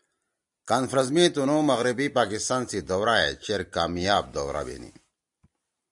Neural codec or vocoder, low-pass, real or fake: none; 10.8 kHz; real